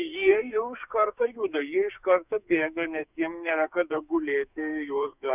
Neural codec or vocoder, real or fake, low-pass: codec, 44.1 kHz, 2.6 kbps, SNAC; fake; 3.6 kHz